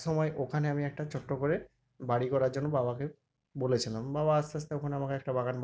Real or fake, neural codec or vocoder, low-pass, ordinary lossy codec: real; none; none; none